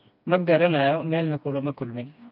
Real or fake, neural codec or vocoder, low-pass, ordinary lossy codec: fake; codec, 16 kHz, 2 kbps, FreqCodec, smaller model; 5.4 kHz; none